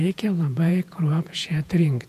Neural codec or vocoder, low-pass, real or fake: vocoder, 48 kHz, 128 mel bands, Vocos; 14.4 kHz; fake